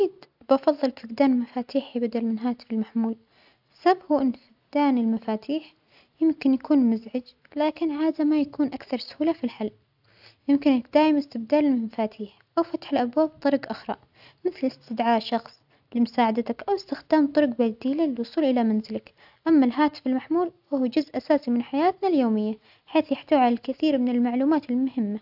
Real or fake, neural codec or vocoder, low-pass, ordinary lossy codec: real; none; 5.4 kHz; AAC, 48 kbps